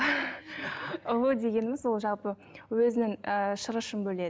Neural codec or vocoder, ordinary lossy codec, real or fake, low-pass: none; none; real; none